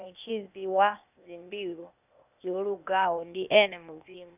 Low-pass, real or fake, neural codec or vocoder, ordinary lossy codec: 3.6 kHz; fake; codec, 16 kHz, 0.8 kbps, ZipCodec; none